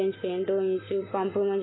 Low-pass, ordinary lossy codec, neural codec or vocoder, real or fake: 7.2 kHz; AAC, 16 kbps; none; real